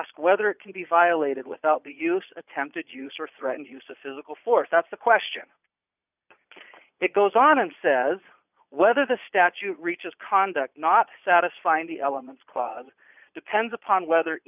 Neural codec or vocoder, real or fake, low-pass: vocoder, 22.05 kHz, 80 mel bands, Vocos; fake; 3.6 kHz